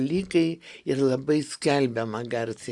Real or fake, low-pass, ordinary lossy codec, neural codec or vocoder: real; 10.8 kHz; Opus, 64 kbps; none